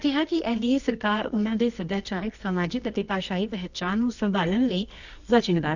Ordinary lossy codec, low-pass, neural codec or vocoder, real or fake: none; 7.2 kHz; codec, 24 kHz, 0.9 kbps, WavTokenizer, medium music audio release; fake